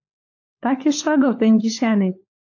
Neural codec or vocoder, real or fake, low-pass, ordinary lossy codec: codec, 16 kHz, 4 kbps, FunCodec, trained on LibriTTS, 50 frames a second; fake; 7.2 kHz; AAC, 48 kbps